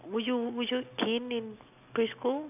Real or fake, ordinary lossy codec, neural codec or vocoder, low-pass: real; none; none; 3.6 kHz